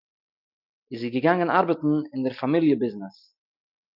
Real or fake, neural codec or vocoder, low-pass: real; none; 5.4 kHz